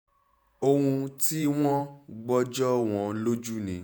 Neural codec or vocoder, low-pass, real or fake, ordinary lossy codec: vocoder, 48 kHz, 128 mel bands, Vocos; none; fake; none